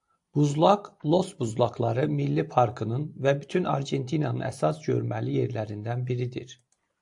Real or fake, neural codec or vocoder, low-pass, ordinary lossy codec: fake; vocoder, 44.1 kHz, 128 mel bands every 256 samples, BigVGAN v2; 10.8 kHz; AAC, 64 kbps